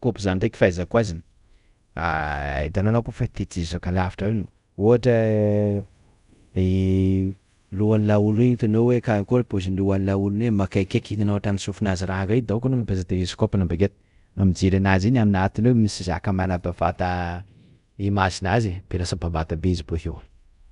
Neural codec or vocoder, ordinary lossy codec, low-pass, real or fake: codec, 24 kHz, 0.5 kbps, DualCodec; none; 10.8 kHz; fake